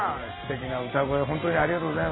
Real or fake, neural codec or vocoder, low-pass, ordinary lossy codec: real; none; 7.2 kHz; AAC, 16 kbps